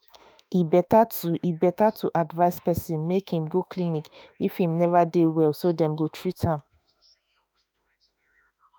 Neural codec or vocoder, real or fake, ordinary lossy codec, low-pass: autoencoder, 48 kHz, 32 numbers a frame, DAC-VAE, trained on Japanese speech; fake; none; none